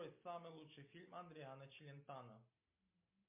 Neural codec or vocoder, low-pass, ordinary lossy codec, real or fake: none; 3.6 kHz; MP3, 32 kbps; real